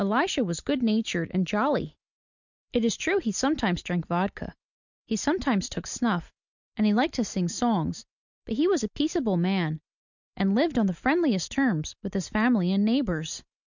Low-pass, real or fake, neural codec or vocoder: 7.2 kHz; real; none